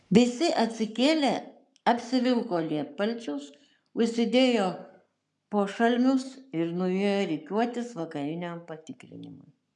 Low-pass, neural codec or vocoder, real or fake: 10.8 kHz; codec, 44.1 kHz, 7.8 kbps, Pupu-Codec; fake